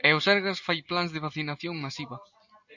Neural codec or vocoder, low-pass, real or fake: none; 7.2 kHz; real